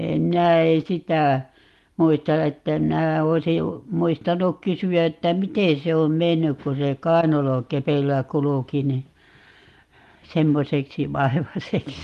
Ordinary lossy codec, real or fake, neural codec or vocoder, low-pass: Opus, 24 kbps; real; none; 14.4 kHz